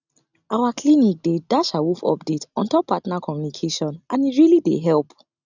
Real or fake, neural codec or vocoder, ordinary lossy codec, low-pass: real; none; none; 7.2 kHz